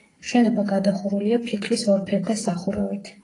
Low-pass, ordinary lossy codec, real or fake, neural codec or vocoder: 10.8 kHz; AAC, 32 kbps; fake; codec, 44.1 kHz, 2.6 kbps, SNAC